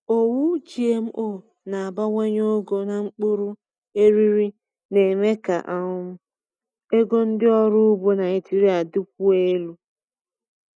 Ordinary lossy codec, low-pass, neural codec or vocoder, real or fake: none; 9.9 kHz; none; real